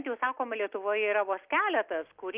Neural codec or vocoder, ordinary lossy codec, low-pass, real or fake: none; Opus, 32 kbps; 3.6 kHz; real